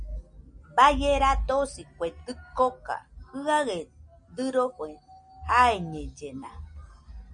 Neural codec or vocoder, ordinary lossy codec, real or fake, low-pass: none; Opus, 64 kbps; real; 9.9 kHz